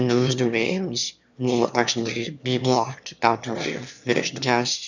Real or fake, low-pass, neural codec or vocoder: fake; 7.2 kHz; autoencoder, 22.05 kHz, a latent of 192 numbers a frame, VITS, trained on one speaker